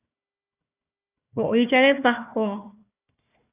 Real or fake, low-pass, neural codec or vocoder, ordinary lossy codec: fake; 3.6 kHz; codec, 16 kHz, 1 kbps, FunCodec, trained on Chinese and English, 50 frames a second; AAC, 24 kbps